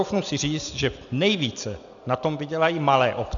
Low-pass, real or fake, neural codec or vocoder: 7.2 kHz; real; none